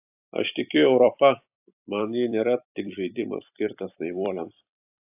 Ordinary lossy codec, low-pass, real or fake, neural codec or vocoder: AAC, 32 kbps; 3.6 kHz; fake; vocoder, 44.1 kHz, 128 mel bands every 256 samples, BigVGAN v2